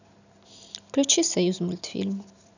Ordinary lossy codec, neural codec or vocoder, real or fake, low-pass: none; none; real; 7.2 kHz